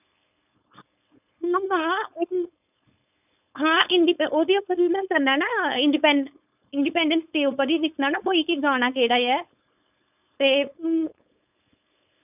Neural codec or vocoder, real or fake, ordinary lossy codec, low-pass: codec, 16 kHz, 4.8 kbps, FACodec; fake; none; 3.6 kHz